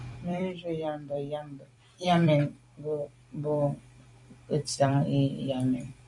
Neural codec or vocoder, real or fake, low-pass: vocoder, 44.1 kHz, 128 mel bands every 256 samples, BigVGAN v2; fake; 10.8 kHz